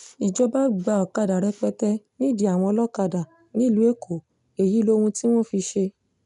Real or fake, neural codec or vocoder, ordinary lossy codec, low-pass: real; none; none; 10.8 kHz